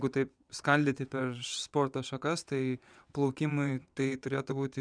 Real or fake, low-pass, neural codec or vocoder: fake; 9.9 kHz; vocoder, 22.05 kHz, 80 mel bands, WaveNeXt